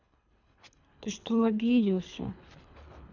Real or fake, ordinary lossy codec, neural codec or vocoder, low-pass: fake; none; codec, 24 kHz, 3 kbps, HILCodec; 7.2 kHz